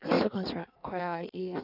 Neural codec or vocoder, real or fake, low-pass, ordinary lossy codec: codec, 16 kHz in and 24 kHz out, 1.1 kbps, FireRedTTS-2 codec; fake; 5.4 kHz; none